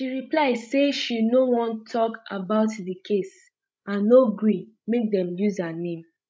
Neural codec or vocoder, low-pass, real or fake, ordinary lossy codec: codec, 16 kHz, 16 kbps, FreqCodec, larger model; none; fake; none